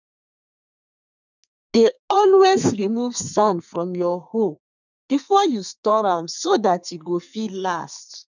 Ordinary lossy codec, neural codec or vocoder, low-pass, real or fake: none; codec, 32 kHz, 1.9 kbps, SNAC; 7.2 kHz; fake